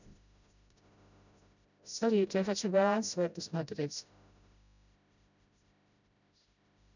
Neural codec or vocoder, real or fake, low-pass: codec, 16 kHz, 0.5 kbps, FreqCodec, smaller model; fake; 7.2 kHz